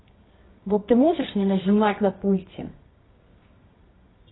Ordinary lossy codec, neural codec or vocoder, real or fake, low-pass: AAC, 16 kbps; codec, 24 kHz, 0.9 kbps, WavTokenizer, medium music audio release; fake; 7.2 kHz